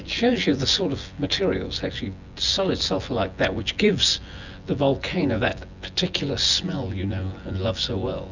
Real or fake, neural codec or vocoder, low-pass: fake; vocoder, 24 kHz, 100 mel bands, Vocos; 7.2 kHz